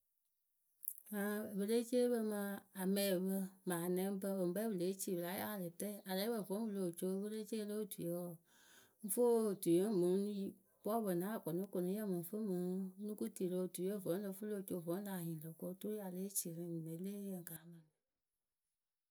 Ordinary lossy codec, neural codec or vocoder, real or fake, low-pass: none; none; real; none